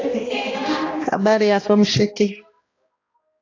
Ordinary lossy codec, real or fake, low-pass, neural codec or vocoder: AAC, 32 kbps; fake; 7.2 kHz; codec, 16 kHz, 1 kbps, X-Codec, HuBERT features, trained on balanced general audio